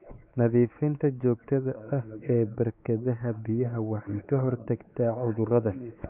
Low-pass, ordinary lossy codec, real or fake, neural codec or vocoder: 3.6 kHz; none; fake; codec, 16 kHz, 4 kbps, X-Codec, WavLM features, trained on Multilingual LibriSpeech